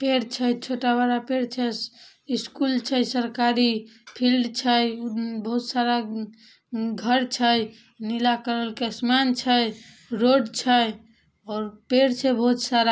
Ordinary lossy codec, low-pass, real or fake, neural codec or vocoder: none; none; real; none